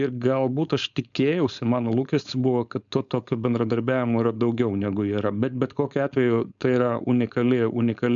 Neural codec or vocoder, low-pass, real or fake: codec, 16 kHz, 4.8 kbps, FACodec; 7.2 kHz; fake